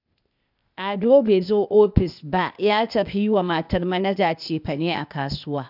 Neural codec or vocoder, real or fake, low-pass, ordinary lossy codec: codec, 16 kHz, 0.8 kbps, ZipCodec; fake; 5.4 kHz; none